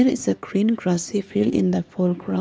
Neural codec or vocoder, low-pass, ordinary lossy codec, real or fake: codec, 16 kHz, 2 kbps, X-Codec, HuBERT features, trained on LibriSpeech; none; none; fake